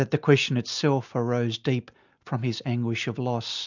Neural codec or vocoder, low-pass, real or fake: none; 7.2 kHz; real